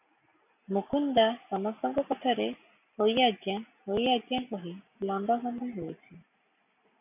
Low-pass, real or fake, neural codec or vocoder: 3.6 kHz; real; none